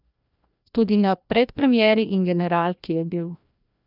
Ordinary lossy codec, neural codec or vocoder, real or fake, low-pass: none; codec, 16 kHz, 1 kbps, FreqCodec, larger model; fake; 5.4 kHz